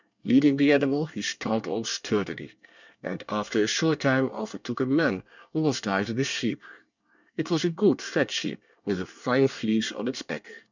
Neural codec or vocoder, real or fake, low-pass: codec, 24 kHz, 1 kbps, SNAC; fake; 7.2 kHz